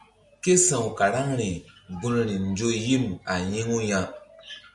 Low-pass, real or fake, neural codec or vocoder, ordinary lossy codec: 10.8 kHz; real; none; MP3, 96 kbps